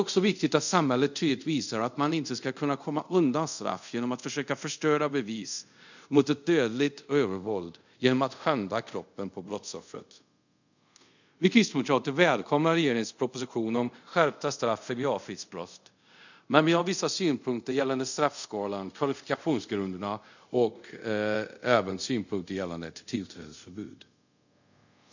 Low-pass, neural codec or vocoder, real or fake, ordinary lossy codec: 7.2 kHz; codec, 24 kHz, 0.5 kbps, DualCodec; fake; none